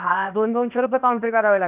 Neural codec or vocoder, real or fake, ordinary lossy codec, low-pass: codec, 16 kHz, 0.8 kbps, ZipCodec; fake; none; 3.6 kHz